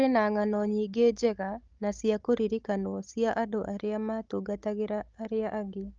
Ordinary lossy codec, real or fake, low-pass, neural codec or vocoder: Opus, 32 kbps; fake; 7.2 kHz; codec, 16 kHz, 16 kbps, FunCodec, trained on Chinese and English, 50 frames a second